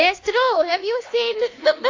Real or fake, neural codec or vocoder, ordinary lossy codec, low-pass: fake; codec, 16 kHz, 2 kbps, X-Codec, HuBERT features, trained on LibriSpeech; AAC, 32 kbps; 7.2 kHz